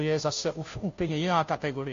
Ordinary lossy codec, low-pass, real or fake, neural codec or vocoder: AAC, 48 kbps; 7.2 kHz; fake; codec, 16 kHz, 0.5 kbps, FunCodec, trained on Chinese and English, 25 frames a second